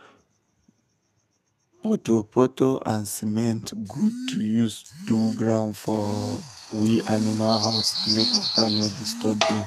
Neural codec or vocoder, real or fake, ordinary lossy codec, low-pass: codec, 32 kHz, 1.9 kbps, SNAC; fake; none; 14.4 kHz